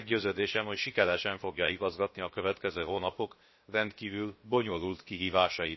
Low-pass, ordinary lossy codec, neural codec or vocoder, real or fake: 7.2 kHz; MP3, 24 kbps; codec, 16 kHz, about 1 kbps, DyCAST, with the encoder's durations; fake